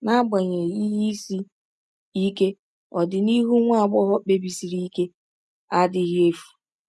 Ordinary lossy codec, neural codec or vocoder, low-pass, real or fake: none; none; none; real